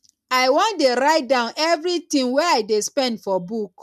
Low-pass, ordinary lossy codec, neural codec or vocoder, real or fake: 14.4 kHz; MP3, 96 kbps; none; real